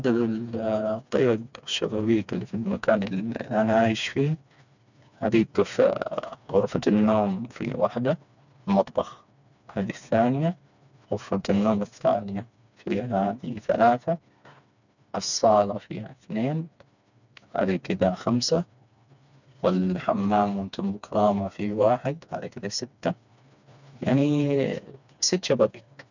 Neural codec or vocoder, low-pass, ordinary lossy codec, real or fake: codec, 16 kHz, 2 kbps, FreqCodec, smaller model; 7.2 kHz; none; fake